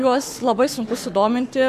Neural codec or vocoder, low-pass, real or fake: codec, 44.1 kHz, 7.8 kbps, Pupu-Codec; 14.4 kHz; fake